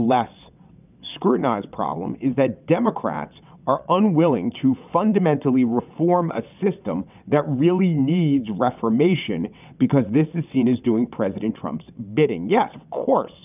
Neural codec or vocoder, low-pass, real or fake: vocoder, 22.05 kHz, 80 mel bands, WaveNeXt; 3.6 kHz; fake